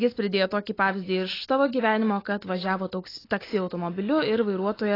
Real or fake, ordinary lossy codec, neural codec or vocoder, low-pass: real; AAC, 24 kbps; none; 5.4 kHz